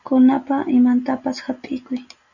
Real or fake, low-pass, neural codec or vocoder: real; 7.2 kHz; none